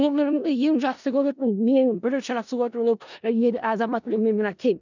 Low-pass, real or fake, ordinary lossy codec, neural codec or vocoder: 7.2 kHz; fake; none; codec, 16 kHz in and 24 kHz out, 0.4 kbps, LongCat-Audio-Codec, four codebook decoder